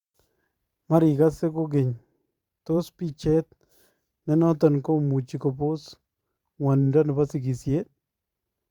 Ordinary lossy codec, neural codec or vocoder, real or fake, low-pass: Opus, 64 kbps; vocoder, 44.1 kHz, 128 mel bands every 512 samples, BigVGAN v2; fake; 19.8 kHz